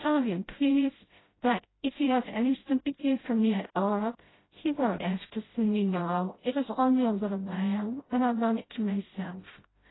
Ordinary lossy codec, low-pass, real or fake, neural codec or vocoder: AAC, 16 kbps; 7.2 kHz; fake; codec, 16 kHz, 0.5 kbps, FreqCodec, smaller model